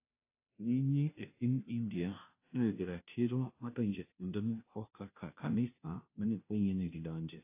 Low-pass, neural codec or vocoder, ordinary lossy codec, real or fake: 3.6 kHz; codec, 16 kHz, 0.5 kbps, FunCodec, trained on Chinese and English, 25 frames a second; AAC, 24 kbps; fake